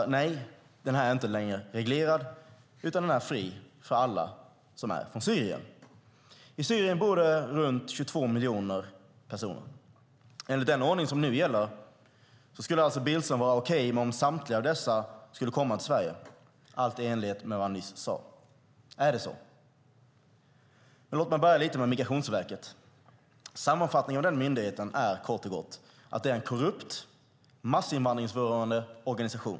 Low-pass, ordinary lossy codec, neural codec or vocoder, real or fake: none; none; none; real